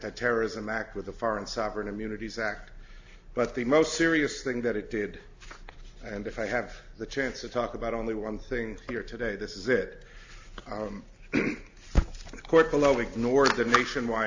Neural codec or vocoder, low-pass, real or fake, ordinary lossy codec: none; 7.2 kHz; real; AAC, 48 kbps